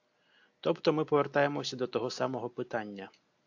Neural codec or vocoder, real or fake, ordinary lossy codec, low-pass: none; real; AAC, 48 kbps; 7.2 kHz